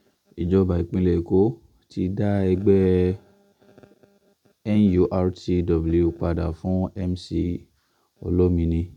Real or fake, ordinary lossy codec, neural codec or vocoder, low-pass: real; none; none; 19.8 kHz